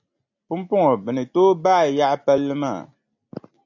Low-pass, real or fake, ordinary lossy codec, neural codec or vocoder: 7.2 kHz; real; AAC, 48 kbps; none